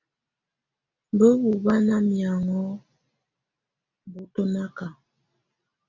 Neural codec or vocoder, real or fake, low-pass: none; real; 7.2 kHz